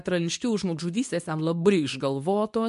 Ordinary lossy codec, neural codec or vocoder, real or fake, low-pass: MP3, 64 kbps; codec, 24 kHz, 0.9 kbps, WavTokenizer, medium speech release version 2; fake; 10.8 kHz